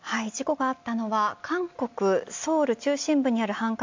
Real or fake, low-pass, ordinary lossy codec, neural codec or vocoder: real; 7.2 kHz; MP3, 64 kbps; none